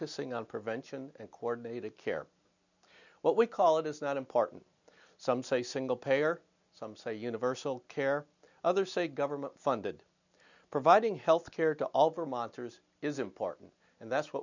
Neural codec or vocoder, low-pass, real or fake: none; 7.2 kHz; real